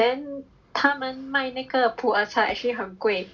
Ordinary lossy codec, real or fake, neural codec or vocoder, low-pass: none; real; none; none